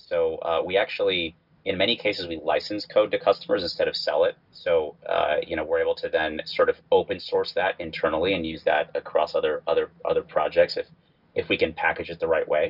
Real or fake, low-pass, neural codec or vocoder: real; 5.4 kHz; none